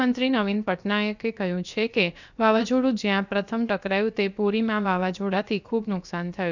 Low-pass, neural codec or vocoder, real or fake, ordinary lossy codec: 7.2 kHz; codec, 16 kHz, about 1 kbps, DyCAST, with the encoder's durations; fake; none